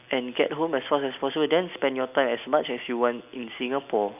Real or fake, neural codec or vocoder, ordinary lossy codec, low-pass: real; none; none; 3.6 kHz